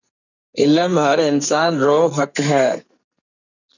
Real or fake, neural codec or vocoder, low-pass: fake; codec, 44.1 kHz, 2.6 kbps, SNAC; 7.2 kHz